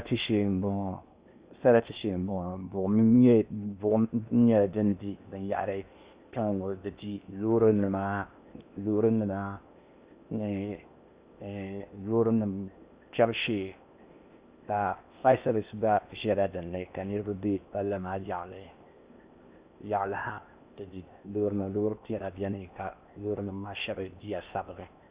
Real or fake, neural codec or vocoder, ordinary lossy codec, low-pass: fake; codec, 16 kHz in and 24 kHz out, 0.8 kbps, FocalCodec, streaming, 65536 codes; Opus, 64 kbps; 3.6 kHz